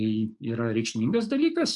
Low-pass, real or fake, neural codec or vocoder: 10.8 kHz; fake; codec, 44.1 kHz, 7.8 kbps, DAC